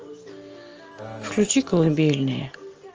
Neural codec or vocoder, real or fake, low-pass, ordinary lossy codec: none; real; 7.2 kHz; Opus, 24 kbps